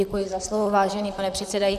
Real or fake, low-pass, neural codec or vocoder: fake; 14.4 kHz; vocoder, 44.1 kHz, 128 mel bands, Pupu-Vocoder